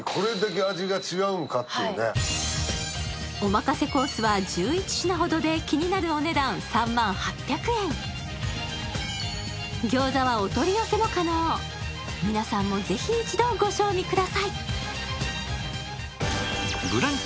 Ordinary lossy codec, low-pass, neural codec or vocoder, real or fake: none; none; none; real